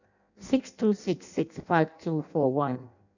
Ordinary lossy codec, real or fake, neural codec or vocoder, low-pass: none; fake; codec, 16 kHz in and 24 kHz out, 0.6 kbps, FireRedTTS-2 codec; 7.2 kHz